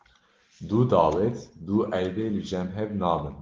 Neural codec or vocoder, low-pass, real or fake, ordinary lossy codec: none; 7.2 kHz; real; Opus, 16 kbps